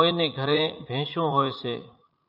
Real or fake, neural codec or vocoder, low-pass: fake; vocoder, 44.1 kHz, 80 mel bands, Vocos; 5.4 kHz